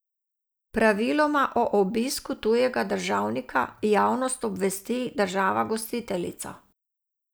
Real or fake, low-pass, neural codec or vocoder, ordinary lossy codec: real; none; none; none